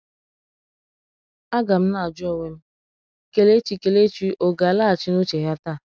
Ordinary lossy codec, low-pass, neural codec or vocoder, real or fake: none; none; none; real